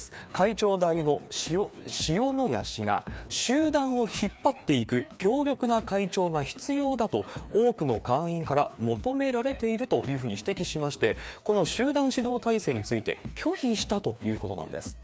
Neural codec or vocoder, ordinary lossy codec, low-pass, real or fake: codec, 16 kHz, 2 kbps, FreqCodec, larger model; none; none; fake